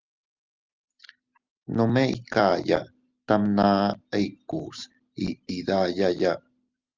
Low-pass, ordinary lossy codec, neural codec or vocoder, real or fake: 7.2 kHz; Opus, 24 kbps; none; real